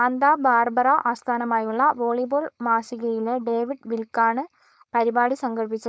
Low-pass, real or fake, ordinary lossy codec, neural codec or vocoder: none; fake; none; codec, 16 kHz, 4.8 kbps, FACodec